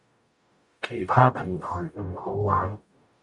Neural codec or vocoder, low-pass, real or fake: codec, 44.1 kHz, 0.9 kbps, DAC; 10.8 kHz; fake